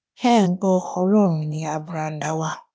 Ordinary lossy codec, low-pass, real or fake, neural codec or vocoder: none; none; fake; codec, 16 kHz, 0.8 kbps, ZipCodec